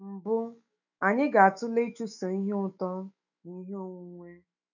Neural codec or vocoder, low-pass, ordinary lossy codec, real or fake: autoencoder, 48 kHz, 128 numbers a frame, DAC-VAE, trained on Japanese speech; 7.2 kHz; none; fake